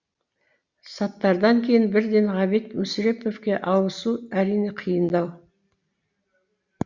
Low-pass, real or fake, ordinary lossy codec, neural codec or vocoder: 7.2 kHz; real; Opus, 64 kbps; none